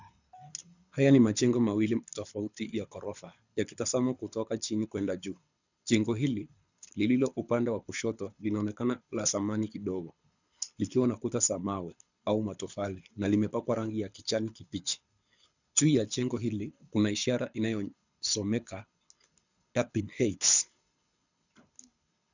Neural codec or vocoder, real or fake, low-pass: codec, 24 kHz, 6 kbps, HILCodec; fake; 7.2 kHz